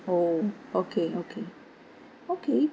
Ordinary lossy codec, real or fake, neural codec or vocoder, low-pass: none; real; none; none